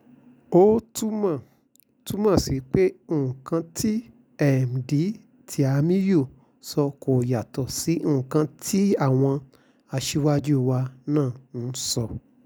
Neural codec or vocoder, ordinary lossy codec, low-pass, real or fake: none; none; none; real